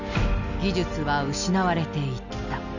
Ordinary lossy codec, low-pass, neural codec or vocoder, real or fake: none; 7.2 kHz; none; real